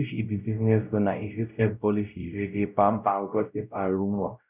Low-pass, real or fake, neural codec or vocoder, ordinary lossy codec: 3.6 kHz; fake; codec, 16 kHz, 0.5 kbps, X-Codec, WavLM features, trained on Multilingual LibriSpeech; none